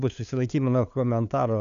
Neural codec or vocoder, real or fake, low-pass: codec, 16 kHz, 2 kbps, FunCodec, trained on LibriTTS, 25 frames a second; fake; 7.2 kHz